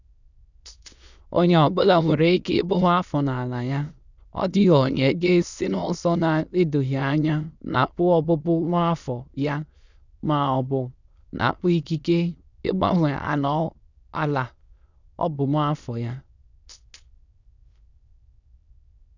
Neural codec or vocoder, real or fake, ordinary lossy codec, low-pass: autoencoder, 22.05 kHz, a latent of 192 numbers a frame, VITS, trained on many speakers; fake; none; 7.2 kHz